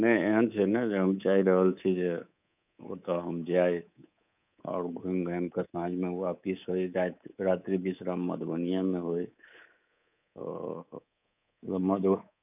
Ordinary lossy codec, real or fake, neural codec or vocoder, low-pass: none; fake; codec, 24 kHz, 3.1 kbps, DualCodec; 3.6 kHz